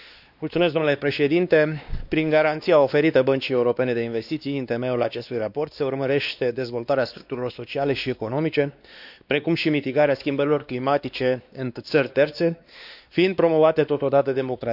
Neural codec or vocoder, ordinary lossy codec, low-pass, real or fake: codec, 16 kHz, 2 kbps, X-Codec, WavLM features, trained on Multilingual LibriSpeech; none; 5.4 kHz; fake